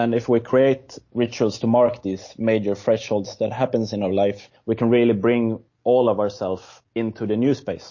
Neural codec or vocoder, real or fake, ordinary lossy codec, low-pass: none; real; MP3, 32 kbps; 7.2 kHz